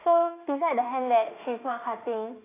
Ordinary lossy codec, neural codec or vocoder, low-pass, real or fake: AAC, 24 kbps; autoencoder, 48 kHz, 32 numbers a frame, DAC-VAE, trained on Japanese speech; 3.6 kHz; fake